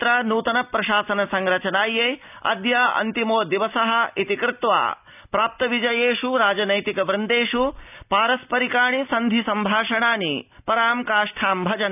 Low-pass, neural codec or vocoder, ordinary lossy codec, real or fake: 3.6 kHz; none; none; real